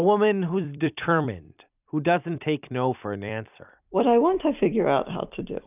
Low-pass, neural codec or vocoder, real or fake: 3.6 kHz; vocoder, 44.1 kHz, 128 mel bands every 256 samples, BigVGAN v2; fake